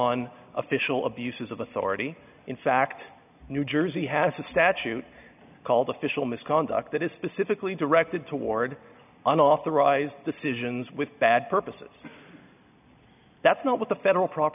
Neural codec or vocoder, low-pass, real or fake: none; 3.6 kHz; real